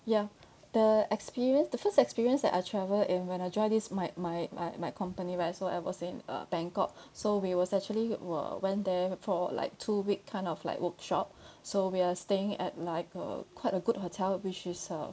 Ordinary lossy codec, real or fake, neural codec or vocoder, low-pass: none; real; none; none